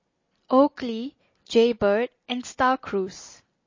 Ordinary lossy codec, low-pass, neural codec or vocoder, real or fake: MP3, 32 kbps; 7.2 kHz; none; real